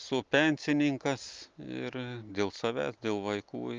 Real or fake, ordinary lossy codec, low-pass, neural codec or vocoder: real; Opus, 24 kbps; 7.2 kHz; none